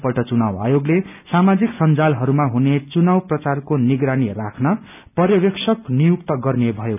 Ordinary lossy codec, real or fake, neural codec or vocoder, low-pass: none; real; none; 3.6 kHz